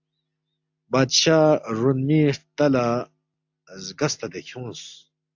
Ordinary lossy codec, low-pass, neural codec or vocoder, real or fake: AAC, 48 kbps; 7.2 kHz; none; real